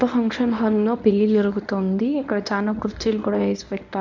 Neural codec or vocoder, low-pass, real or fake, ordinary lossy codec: codec, 24 kHz, 0.9 kbps, WavTokenizer, medium speech release version 1; 7.2 kHz; fake; none